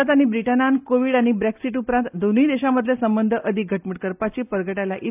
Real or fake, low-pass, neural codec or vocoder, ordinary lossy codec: real; 3.6 kHz; none; AAC, 32 kbps